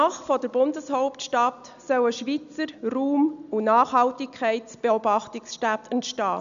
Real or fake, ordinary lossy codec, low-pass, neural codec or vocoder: real; none; 7.2 kHz; none